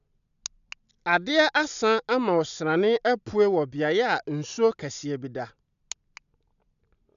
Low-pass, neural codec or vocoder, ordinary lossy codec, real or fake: 7.2 kHz; none; none; real